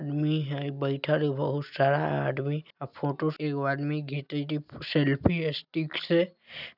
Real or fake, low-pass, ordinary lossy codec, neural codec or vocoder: real; 5.4 kHz; none; none